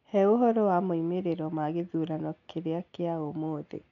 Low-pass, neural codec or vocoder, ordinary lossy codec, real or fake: 7.2 kHz; none; none; real